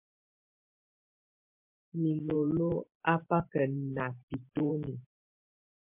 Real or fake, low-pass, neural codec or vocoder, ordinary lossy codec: fake; 3.6 kHz; vocoder, 44.1 kHz, 128 mel bands every 512 samples, BigVGAN v2; MP3, 32 kbps